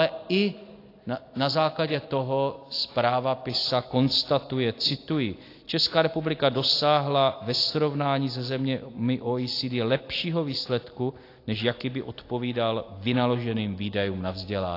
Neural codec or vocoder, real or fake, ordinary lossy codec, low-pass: none; real; AAC, 32 kbps; 5.4 kHz